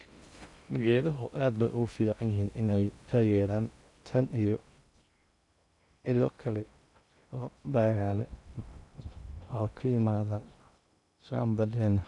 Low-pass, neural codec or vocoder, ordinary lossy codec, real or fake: 10.8 kHz; codec, 16 kHz in and 24 kHz out, 0.6 kbps, FocalCodec, streaming, 2048 codes; none; fake